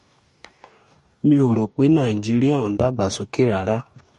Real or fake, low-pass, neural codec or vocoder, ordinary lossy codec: fake; 14.4 kHz; codec, 44.1 kHz, 2.6 kbps, DAC; MP3, 48 kbps